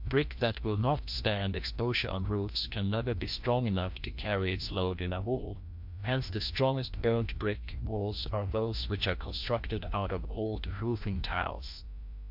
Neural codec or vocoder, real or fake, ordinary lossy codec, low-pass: codec, 16 kHz, 1 kbps, FreqCodec, larger model; fake; MP3, 48 kbps; 5.4 kHz